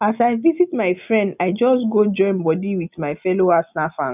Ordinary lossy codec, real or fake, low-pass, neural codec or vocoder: none; real; 3.6 kHz; none